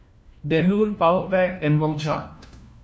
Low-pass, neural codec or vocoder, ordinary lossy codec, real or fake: none; codec, 16 kHz, 1 kbps, FunCodec, trained on LibriTTS, 50 frames a second; none; fake